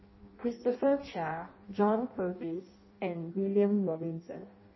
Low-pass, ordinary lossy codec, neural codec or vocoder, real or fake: 7.2 kHz; MP3, 24 kbps; codec, 16 kHz in and 24 kHz out, 0.6 kbps, FireRedTTS-2 codec; fake